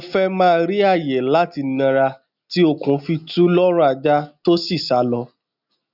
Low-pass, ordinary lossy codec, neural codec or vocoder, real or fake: 5.4 kHz; none; none; real